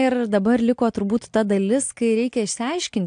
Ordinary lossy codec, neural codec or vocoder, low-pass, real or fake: AAC, 64 kbps; none; 9.9 kHz; real